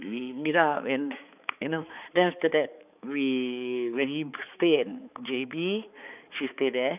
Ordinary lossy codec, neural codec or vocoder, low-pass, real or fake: none; codec, 16 kHz, 4 kbps, X-Codec, HuBERT features, trained on balanced general audio; 3.6 kHz; fake